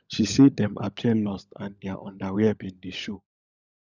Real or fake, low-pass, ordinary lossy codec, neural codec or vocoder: fake; 7.2 kHz; none; codec, 16 kHz, 16 kbps, FunCodec, trained on LibriTTS, 50 frames a second